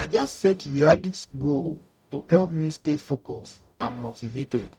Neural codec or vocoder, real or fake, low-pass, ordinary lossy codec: codec, 44.1 kHz, 0.9 kbps, DAC; fake; 19.8 kHz; none